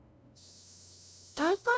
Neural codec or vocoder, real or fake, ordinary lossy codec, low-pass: codec, 16 kHz, 0.5 kbps, FunCodec, trained on LibriTTS, 25 frames a second; fake; none; none